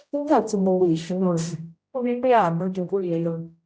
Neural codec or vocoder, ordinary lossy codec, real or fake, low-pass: codec, 16 kHz, 0.5 kbps, X-Codec, HuBERT features, trained on general audio; none; fake; none